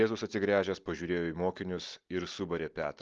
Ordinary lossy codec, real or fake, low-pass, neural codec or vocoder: Opus, 32 kbps; real; 7.2 kHz; none